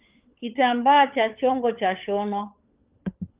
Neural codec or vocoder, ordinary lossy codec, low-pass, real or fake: codec, 16 kHz, 16 kbps, FunCodec, trained on LibriTTS, 50 frames a second; Opus, 32 kbps; 3.6 kHz; fake